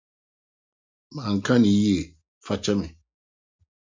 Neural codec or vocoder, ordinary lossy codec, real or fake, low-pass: none; MP3, 64 kbps; real; 7.2 kHz